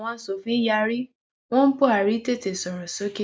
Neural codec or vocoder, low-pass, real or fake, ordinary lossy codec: none; none; real; none